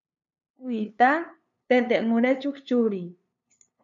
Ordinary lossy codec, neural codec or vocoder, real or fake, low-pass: MP3, 96 kbps; codec, 16 kHz, 2 kbps, FunCodec, trained on LibriTTS, 25 frames a second; fake; 7.2 kHz